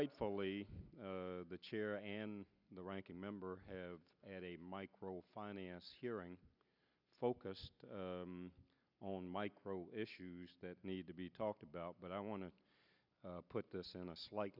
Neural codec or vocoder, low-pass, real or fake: none; 5.4 kHz; real